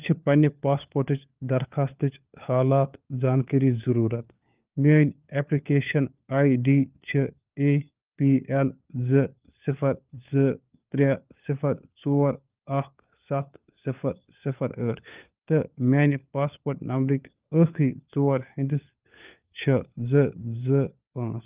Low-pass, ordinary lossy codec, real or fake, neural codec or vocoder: 3.6 kHz; Opus, 32 kbps; fake; codec, 16 kHz, 4 kbps, FunCodec, trained on LibriTTS, 50 frames a second